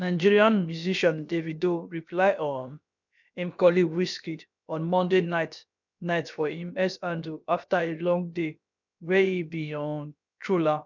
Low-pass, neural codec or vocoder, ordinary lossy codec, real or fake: 7.2 kHz; codec, 16 kHz, about 1 kbps, DyCAST, with the encoder's durations; none; fake